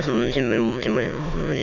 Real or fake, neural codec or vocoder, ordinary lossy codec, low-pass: fake; autoencoder, 22.05 kHz, a latent of 192 numbers a frame, VITS, trained on many speakers; none; 7.2 kHz